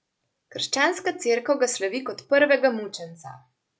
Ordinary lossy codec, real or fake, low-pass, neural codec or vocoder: none; real; none; none